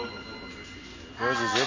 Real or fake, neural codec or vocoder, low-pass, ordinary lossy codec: real; none; 7.2 kHz; MP3, 32 kbps